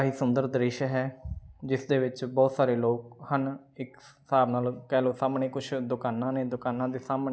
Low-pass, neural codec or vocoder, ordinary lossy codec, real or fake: none; none; none; real